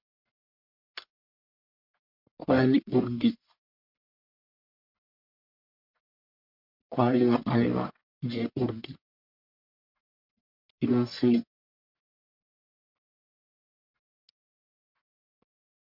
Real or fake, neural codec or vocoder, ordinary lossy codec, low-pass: fake; codec, 44.1 kHz, 1.7 kbps, Pupu-Codec; MP3, 48 kbps; 5.4 kHz